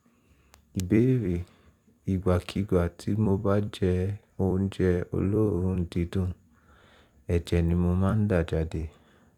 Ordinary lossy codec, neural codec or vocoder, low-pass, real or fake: none; vocoder, 44.1 kHz, 128 mel bands every 256 samples, BigVGAN v2; 19.8 kHz; fake